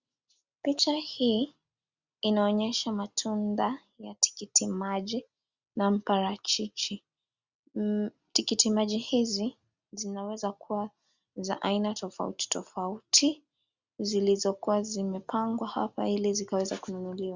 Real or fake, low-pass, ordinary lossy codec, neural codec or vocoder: real; 7.2 kHz; Opus, 64 kbps; none